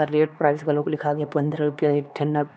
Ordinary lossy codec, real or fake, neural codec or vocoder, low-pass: none; fake; codec, 16 kHz, 2 kbps, X-Codec, HuBERT features, trained on LibriSpeech; none